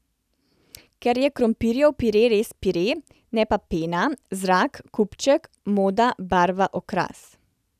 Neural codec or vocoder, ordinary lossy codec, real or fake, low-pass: none; none; real; 14.4 kHz